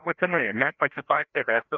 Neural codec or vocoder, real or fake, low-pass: codec, 16 kHz, 1 kbps, FreqCodec, larger model; fake; 7.2 kHz